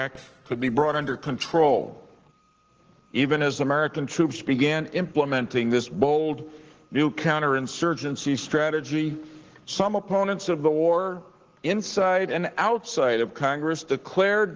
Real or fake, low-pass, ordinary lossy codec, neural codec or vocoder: fake; 7.2 kHz; Opus, 16 kbps; codec, 44.1 kHz, 7.8 kbps, Pupu-Codec